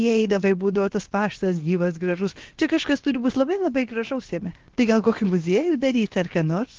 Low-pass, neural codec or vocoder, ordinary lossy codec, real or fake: 7.2 kHz; codec, 16 kHz, about 1 kbps, DyCAST, with the encoder's durations; Opus, 16 kbps; fake